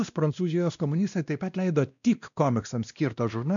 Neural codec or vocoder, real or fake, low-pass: codec, 16 kHz, 1 kbps, X-Codec, WavLM features, trained on Multilingual LibriSpeech; fake; 7.2 kHz